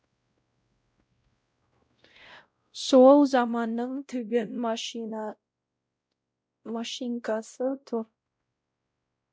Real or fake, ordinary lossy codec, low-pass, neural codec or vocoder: fake; none; none; codec, 16 kHz, 0.5 kbps, X-Codec, WavLM features, trained on Multilingual LibriSpeech